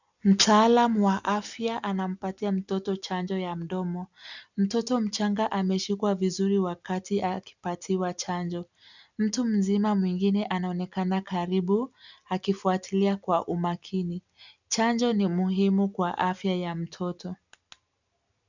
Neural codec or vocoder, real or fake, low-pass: none; real; 7.2 kHz